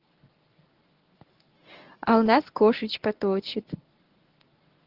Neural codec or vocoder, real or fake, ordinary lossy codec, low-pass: codec, 24 kHz, 0.9 kbps, WavTokenizer, medium speech release version 1; fake; Opus, 16 kbps; 5.4 kHz